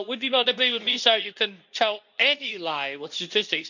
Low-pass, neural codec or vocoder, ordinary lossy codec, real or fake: 7.2 kHz; codec, 24 kHz, 0.9 kbps, WavTokenizer, medium speech release version 2; none; fake